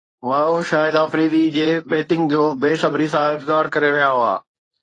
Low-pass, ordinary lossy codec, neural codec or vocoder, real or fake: 10.8 kHz; AAC, 32 kbps; codec, 24 kHz, 0.9 kbps, WavTokenizer, medium speech release version 1; fake